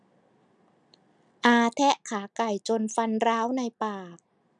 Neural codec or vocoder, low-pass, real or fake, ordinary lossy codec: none; 9.9 kHz; real; none